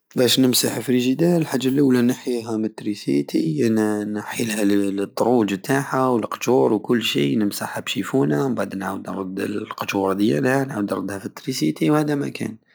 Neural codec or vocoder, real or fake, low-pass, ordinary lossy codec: none; real; none; none